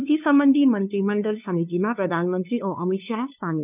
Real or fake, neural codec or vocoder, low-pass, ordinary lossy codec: fake; codec, 16 kHz, 2 kbps, FunCodec, trained on LibriTTS, 25 frames a second; 3.6 kHz; none